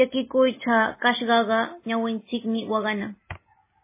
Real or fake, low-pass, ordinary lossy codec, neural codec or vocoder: real; 3.6 kHz; MP3, 16 kbps; none